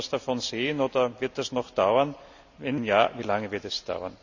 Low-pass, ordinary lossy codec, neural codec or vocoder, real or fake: 7.2 kHz; none; none; real